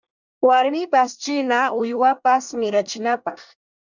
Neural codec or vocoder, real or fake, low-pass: codec, 24 kHz, 1 kbps, SNAC; fake; 7.2 kHz